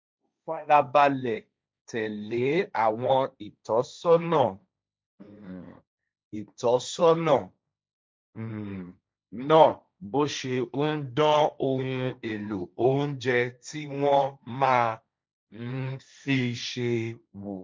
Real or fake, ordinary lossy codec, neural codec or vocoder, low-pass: fake; none; codec, 16 kHz, 1.1 kbps, Voila-Tokenizer; none